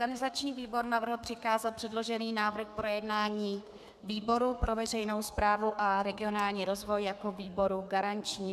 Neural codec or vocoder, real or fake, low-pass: codec, 32 kHz, 1.9 kbps, SNAC; fake; 14.4 kHz